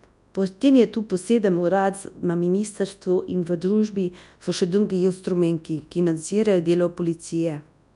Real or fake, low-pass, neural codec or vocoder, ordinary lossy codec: fake; 10.8 kHz; codec, 24 kHz, 0.9 kbps, WavTokenizer, large speech release; none